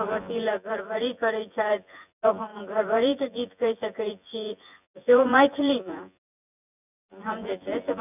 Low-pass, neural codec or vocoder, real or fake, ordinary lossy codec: 3.6 kHz; vocoder, 24 kHz, 100 mel bands, Vocos; fake; none